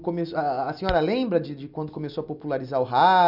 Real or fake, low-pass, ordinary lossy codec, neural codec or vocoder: real; 5.4 kHz; none; none